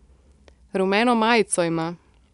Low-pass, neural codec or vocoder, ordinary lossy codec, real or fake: 10.8 kHz; none; none; real